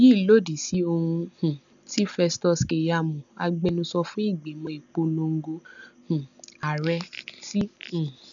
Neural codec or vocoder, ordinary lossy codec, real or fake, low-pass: none; none; real; 7.2 kHz